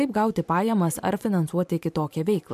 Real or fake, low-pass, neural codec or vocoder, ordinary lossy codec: fake; 14.4 kHz; vocoder, 44.1 kHz, 128 mel bands every 512 samples, BigVGAN v2; MP3, 96 kbps